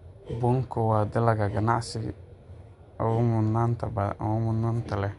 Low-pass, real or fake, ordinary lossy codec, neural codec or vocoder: 10.8 kHz; real; none; none